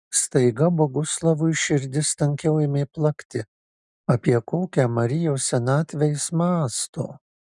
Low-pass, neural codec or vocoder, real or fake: 10.8 kHz; none; real